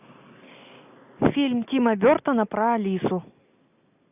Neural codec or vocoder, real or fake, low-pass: none; real; 3.6 kHz